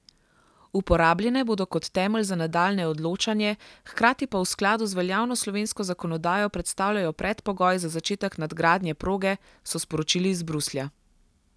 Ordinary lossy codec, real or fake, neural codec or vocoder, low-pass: none; real; none; none